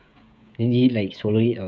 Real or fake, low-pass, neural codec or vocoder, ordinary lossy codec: fake; none; codec, 16 kHz, 16 kbps, FreqCodec, smaller model; none